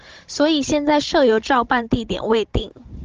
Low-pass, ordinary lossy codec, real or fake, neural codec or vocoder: 7.2 kHz; Opus, 16 kbps; fake; codec, 16 kHz, 16 kbps, FunCodec, trained on Chinese and English, 50 frames a second